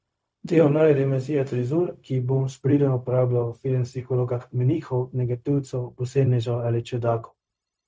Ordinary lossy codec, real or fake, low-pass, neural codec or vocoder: none; fake; none; codec, 16 kHz, 0.4 kbps, LongCat-Audio-Codec